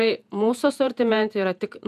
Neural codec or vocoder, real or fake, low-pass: vocoder, 48 kHz, 128 mel bands, Vocos; fake; 14.4 kHz